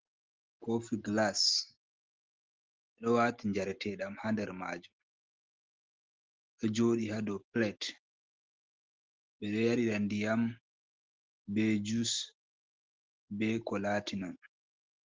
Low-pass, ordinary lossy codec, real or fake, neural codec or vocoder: 7.2 kHz; Opus, 16 kbps; real; none